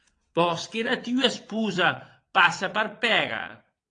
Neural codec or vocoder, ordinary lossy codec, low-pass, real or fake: vocoder, 22.05 kHz, 80 mel bands, WaveNeXt; AAC, 48 kbps; 9.9 kHz; fake